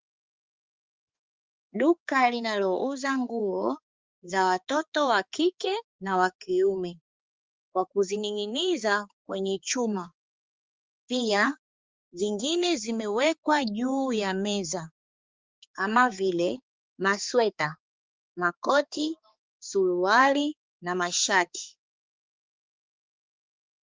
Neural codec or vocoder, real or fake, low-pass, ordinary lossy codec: codec, 16 kHz, 4 kbps, X-Codec, HuBERT features, trained on balanced general audio; fake; 7.2 kHz; Opus, 32 kbps